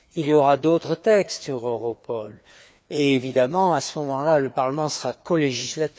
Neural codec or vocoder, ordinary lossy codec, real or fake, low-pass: codec, 16 kHz, 2 kbps, FreqCodec, larger model; none; fake; none